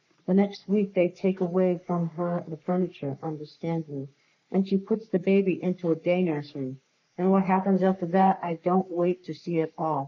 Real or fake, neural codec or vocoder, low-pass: fake; codec, 44.1 kHz, 3.4 kbps, Pupu-Codec; 7.2 kHz